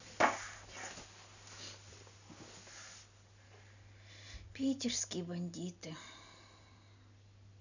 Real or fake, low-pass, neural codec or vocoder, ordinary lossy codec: real; 7.2 kHz; none; none